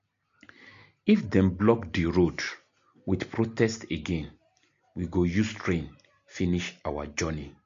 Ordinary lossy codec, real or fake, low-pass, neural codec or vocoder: MP3, 48 kbps; real; 7.2 kHz; none